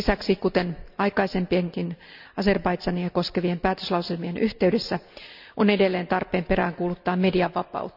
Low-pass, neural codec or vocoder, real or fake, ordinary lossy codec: 5.4 kHz; none; real; none